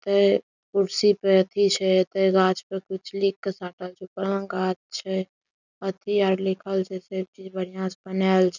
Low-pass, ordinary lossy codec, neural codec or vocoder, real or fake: 7.2 kHz; none; none; real